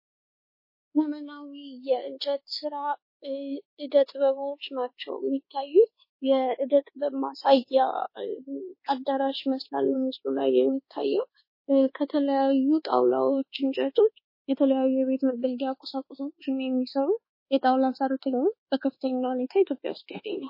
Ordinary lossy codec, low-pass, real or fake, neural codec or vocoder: MP3, 24 kbps; 5.4 kHz; fake; codec, 24 kHz, 1.2 kbps, DualCodec